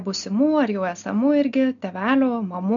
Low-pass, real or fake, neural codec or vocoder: 7.2 kHz; real; none